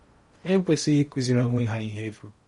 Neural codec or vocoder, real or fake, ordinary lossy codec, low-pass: codec, 16 kHz in and 24 kHz out, 0.8 kbps, FocalCodec, streaming, 65536 codes; fake; MP3, 48 kbps; 10.8 kHz